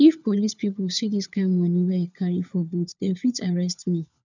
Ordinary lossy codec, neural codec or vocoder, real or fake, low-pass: none; codec, 16 kHz, 4 kbps, FunCodec, trained on LibriTTS, 50 frames a second; fake; 7.2 kHz